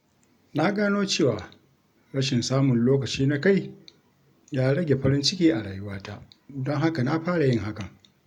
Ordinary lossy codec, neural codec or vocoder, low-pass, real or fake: none; none; 19.8 kHz; real